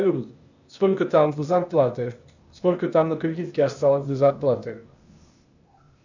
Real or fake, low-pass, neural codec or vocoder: fake; 7.2 kHz; codec, 16 kHz, 0.8 kbps, ZipCodec